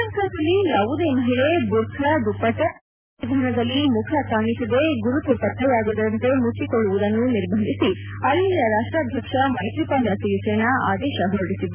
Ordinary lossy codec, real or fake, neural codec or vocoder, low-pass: none; real; none; 3.6 kHz